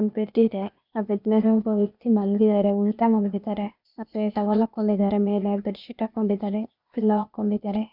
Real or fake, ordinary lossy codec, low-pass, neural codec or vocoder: fake; none; 5.4 kHz; codec, 16 kHz, 0.8 kbps, ZipCodec